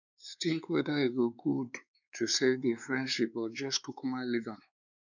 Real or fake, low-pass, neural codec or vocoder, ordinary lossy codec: fake; 7.2 kHz; codec, 16 kHz, 4 kbps, X-Codec, WavLM features, trained on Multilingual LibriSpeech; none